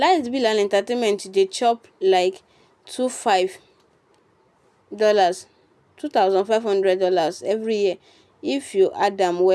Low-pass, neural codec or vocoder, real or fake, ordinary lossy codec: none; none; real; none